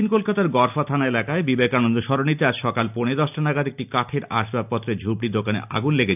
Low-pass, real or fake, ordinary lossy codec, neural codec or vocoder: 3.6 kHz; real; none; none